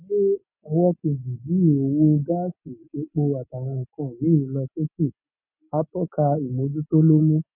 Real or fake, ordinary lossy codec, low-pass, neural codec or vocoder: real; none; 3.6 kHz; none